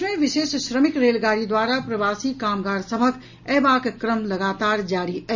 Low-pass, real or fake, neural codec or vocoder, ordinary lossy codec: 7.2 kHz; real; none; none